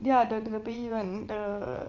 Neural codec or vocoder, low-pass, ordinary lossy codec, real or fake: vocoder, 22.05 kHz, 80 mel bands, WaveNeXt; 7.2 kHz; AAC, 48 kbps; fake